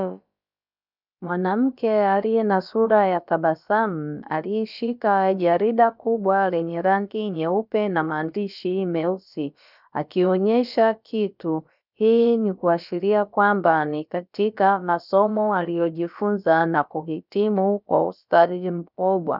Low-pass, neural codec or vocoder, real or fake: 5.4 kHz; codec, 16 kHz, about 1 kbps, DyCAST, with the encoder's durations; fake